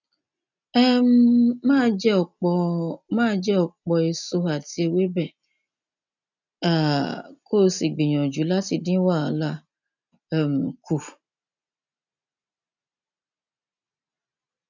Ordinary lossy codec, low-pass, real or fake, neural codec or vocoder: none; 7.2 kHz; real; none